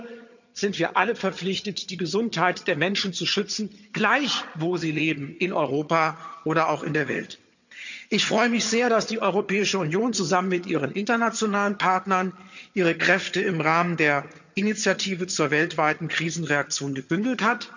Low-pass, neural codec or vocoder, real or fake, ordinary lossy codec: 7.2 kHz; vocoder, 22.05 kHz, 80 mel bands, HiFi-GAN; fake; none